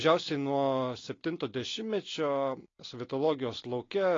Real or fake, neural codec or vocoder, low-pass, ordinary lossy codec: real; none; 7.2 kHz; AAC, 32 kbps